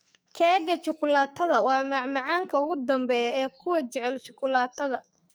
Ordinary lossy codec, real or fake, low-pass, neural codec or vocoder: none; fake; none; codec, 44.1 kHz, 2.6 kbps, SNAC